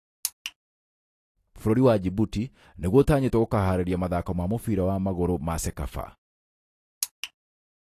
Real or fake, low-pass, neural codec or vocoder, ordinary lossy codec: real; 14.4 kHz; none; AAC, 48 kbps